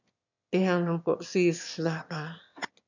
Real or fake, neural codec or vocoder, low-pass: fake; autoencoder, 22.05 kHz, a latent of 192 numbers a frame, VITS, trained on one speaker; 7.2 kHz